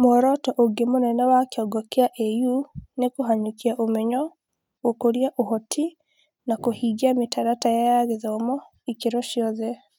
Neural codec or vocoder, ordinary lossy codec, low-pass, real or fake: none; none; 19.8 kHz; real